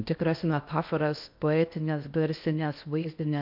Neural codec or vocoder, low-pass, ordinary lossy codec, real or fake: codec, 16 kHz in and 24 kHz out, 0.8 kbps, FocalCodec, streaming, 65536 codes; 5.4 kHz; MP3, 48 kbps; fake